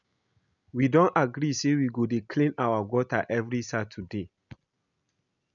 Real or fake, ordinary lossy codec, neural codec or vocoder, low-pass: real; none; none; 7.2 kHz